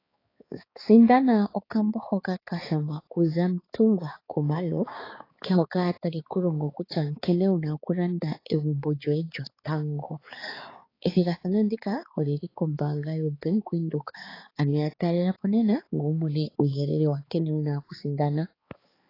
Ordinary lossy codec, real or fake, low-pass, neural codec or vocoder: AAC, 24 kbps; fake; 5.4 kHz; codec, 16 kHz, 4 kbps, X-Codec, HuBERT features, trained on balanced general audio